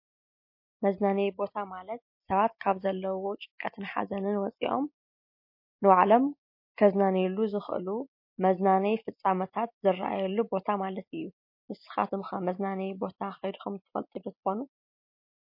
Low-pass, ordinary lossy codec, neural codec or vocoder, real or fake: 5.4 kHz; MP3, 32 kbps; none; real